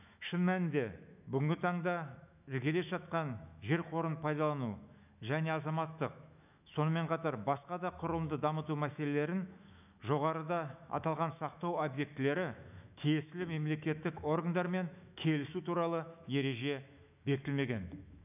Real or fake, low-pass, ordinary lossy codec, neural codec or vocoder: fake; 3.6 kHz; none; autoencoder, 48 kHz, 128 numbers a frame, DAC-VAE, trained on Japanese speech